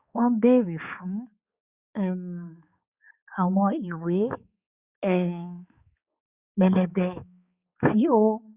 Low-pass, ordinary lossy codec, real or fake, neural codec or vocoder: 3.6 kHz; Opus, 64 kbps; fake; codec, 16 kHz, 4 kbps, X-Codec, HuBERT features, trained on balanced general audio